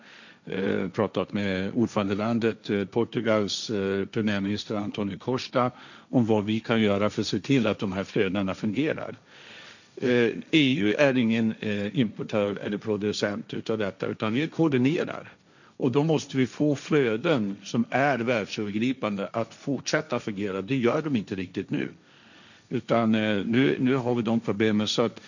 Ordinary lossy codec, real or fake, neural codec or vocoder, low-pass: none; fake; codec, 16 kHz, 1.1 kbps, Voila-Tokenizer; 7.2 kHz